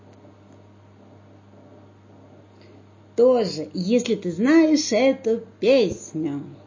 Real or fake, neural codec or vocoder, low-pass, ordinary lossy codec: real; none; 7.2 kHz; MP3, 32 kbps